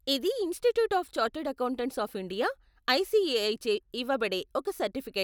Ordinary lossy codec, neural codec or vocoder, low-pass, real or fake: none; none; none; real